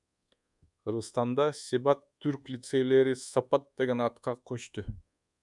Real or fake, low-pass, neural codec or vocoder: fake; 10.8 kHz; codec, 24 kHz, 1.2 kbps, DualCodec